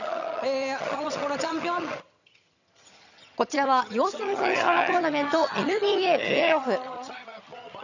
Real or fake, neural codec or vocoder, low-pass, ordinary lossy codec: fake; vocoder, 22.05 kHz, 80 mel bands, HiFi-GAN; 7.2 kHz; none